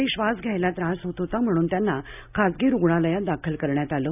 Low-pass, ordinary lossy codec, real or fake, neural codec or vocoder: 3.6 kHz; none; real; none